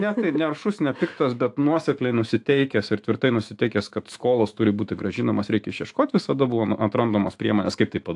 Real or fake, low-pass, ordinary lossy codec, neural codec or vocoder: fake; 10.8 kHz; AAC, 64 kbps; autoencoder, 48 kHz, 128 numbers a frame, DAC-VAE, trained on Japanese speech